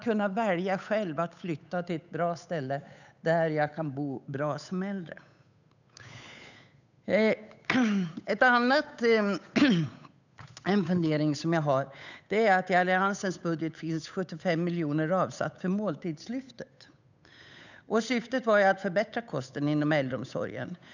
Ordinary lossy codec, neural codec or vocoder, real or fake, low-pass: none; codec, 16 kHz, 8 kbps, FunCodec, trained on Chinese and English, 25 frames a second; fake; 7.2 kHz